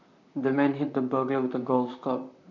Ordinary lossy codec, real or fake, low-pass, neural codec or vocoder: none; fake; 7.2 kHz; vocoder, 44.1 kHz, 128 mel bands, Pupu-Vocoder